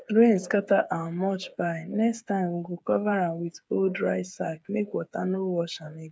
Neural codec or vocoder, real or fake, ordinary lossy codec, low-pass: codec, 16 kHz, 8 kbps, FreqCodec, smaller model; fake; none; none